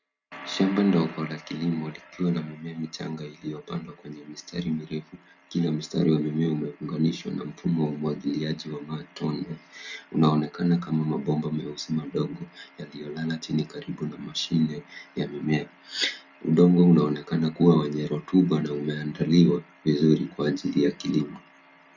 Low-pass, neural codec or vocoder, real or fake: 7.2 kHz; none; real